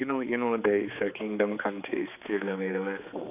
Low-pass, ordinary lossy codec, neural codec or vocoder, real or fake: 3.6 kHz; none; codec, 16 kHz, 4 kbps, X-Codec, HuBERT features, trained on general audio; fake